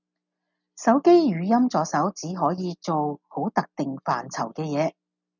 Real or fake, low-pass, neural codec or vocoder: real; 7.2 kHz; none